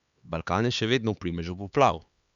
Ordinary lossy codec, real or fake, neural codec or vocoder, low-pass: Opus, 64 kbps; fake; codec, 16 kHz, 4 kbps, X-Codec, HuBERT features, trained on LibriSpeech; 7.2 kHz